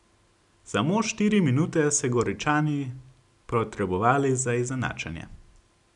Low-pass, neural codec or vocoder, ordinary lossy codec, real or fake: 10.8 kHz; none; none; real